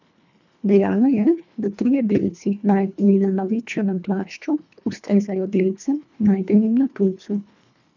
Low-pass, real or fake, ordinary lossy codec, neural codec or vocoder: 7.2 kHz; fake; none; codec, 24 kHz, 1.5 kbps, HILCodec